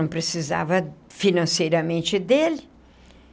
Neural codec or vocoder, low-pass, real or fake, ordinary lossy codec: none; none; real; none